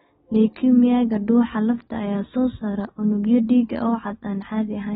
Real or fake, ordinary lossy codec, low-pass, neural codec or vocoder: real; AAC, 16 kbps; 14.4 kHz; none